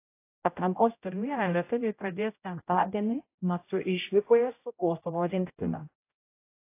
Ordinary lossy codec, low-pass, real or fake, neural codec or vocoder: AAC, 24 kbps; 3.6 kHz; fake; codec, 16 kHz, 0.5 kbps, X-Codec, HuBERT features, trained on general audio